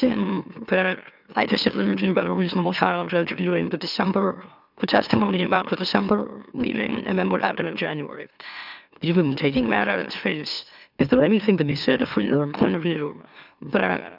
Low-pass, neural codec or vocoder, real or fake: 5.4 kHz; autoencoder, 44.1 kHz, a latent of 192 numbers a frame, MeloTTS; fake